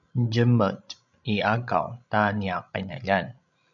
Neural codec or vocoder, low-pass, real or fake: codec, 16 kHz, 16 kbps, FreqCodec, larger model; 7.2 kHz; fake